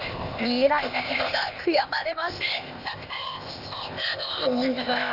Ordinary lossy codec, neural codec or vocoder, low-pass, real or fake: none; codec, 16 kHz, 0.8 kbps, ZipCodec; 5.4 kHz; fake